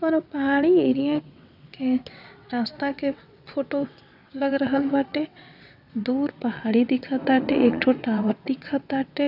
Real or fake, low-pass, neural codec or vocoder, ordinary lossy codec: fake; 5.4 kHz; vocoder, 22.05 kHz, 80 mel bands, WaveNeXt; none